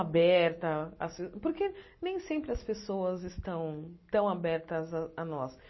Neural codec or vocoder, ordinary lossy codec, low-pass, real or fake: none; MP3, 24 kbps; 7.2 kHz; real